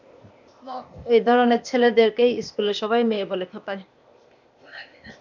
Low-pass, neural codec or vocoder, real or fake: 7.2 kHz; codec, 16 kHz, 0.8 kbps, ZipCodec; fake